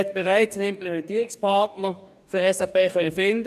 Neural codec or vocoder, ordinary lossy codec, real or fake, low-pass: codec, 44.1 kHz, 2.6 kbps, DAC; none; fake; 14.4 kHz